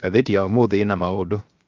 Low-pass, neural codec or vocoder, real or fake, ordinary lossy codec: 7.2 kHz; codec, 16 kHz, 0.7 kbps, FocalCodec; fake; Opus, 32 kbps